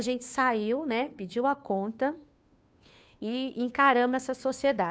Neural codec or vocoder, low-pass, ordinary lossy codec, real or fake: codec, 16 kHz, 2 kbps, FunCodec, trained on Chinese and English, 25 frames a second; none; none; fake